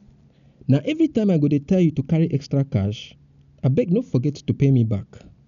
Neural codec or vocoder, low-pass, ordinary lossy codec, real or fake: none; 7.2 kHz; none; real